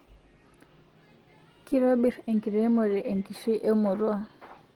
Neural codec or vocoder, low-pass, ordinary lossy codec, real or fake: none; 19.8 kHz; Opus, 16 kbps; real